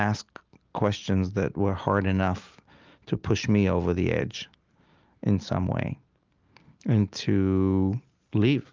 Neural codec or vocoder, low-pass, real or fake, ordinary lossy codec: none; 7.2 kHz; real; Opus, 24 kbps